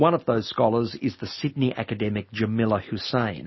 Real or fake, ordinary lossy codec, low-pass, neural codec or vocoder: real; MP3, 24 kbps; 7.2 kHz; none